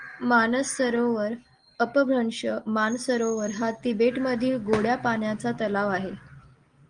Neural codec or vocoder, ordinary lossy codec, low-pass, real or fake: none; Opus, 24 kbps; 9.9 kHz; real